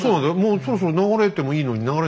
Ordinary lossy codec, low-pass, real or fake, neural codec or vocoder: none; none; real; none